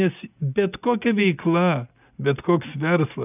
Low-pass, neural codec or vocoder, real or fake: 3.6 kHz; none; real